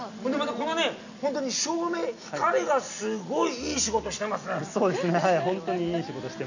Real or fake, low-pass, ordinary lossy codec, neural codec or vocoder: real; 7.2 kHz; none; none